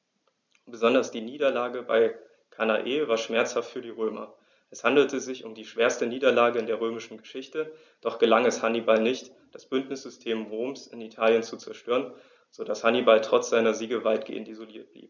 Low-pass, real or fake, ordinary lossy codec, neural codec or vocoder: 7.2 kHz; real; none; none